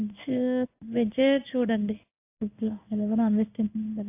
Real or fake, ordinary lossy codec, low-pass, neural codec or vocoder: fake; AAC, 24 kbps; 3.6 kHz; codec, 16 kHz in and 24 kHz out, 1 kbps, XY-Tokenizer